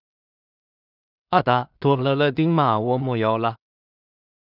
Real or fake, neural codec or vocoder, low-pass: fake; codec, 16 kHz in and 24 kHz out, 0.4 kbps, LongCat-Audio-Codec, two codebook decoder; 5.4 kHz